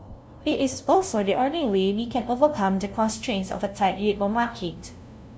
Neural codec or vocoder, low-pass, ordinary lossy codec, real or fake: codec, 16 kHz, 0.5 kbps, FunCodec, trained on LibriTTS, 25 frames a second; none; none; fake